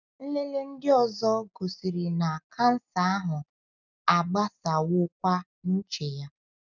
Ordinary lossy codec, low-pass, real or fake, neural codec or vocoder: none; 7.2 kHz; real; none